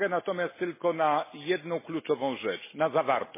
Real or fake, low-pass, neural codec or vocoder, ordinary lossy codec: real; 3.6 kHz; none; MP3, 16 kbps